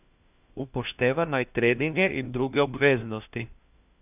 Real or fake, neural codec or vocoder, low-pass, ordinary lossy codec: fake; codec, 16 kHz, 1 kbps, FunCodec, trained on LibriTTS, 50 frames a second; 3.6 kHz; none